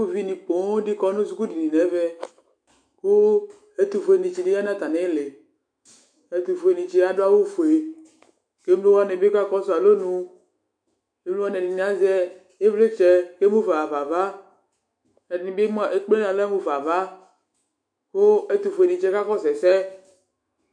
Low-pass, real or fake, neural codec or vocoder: 9.9 kHz; fake; autoencoder, 48 kHz, 128 numbers a frame, DAC-VAE, trained on Japanese speech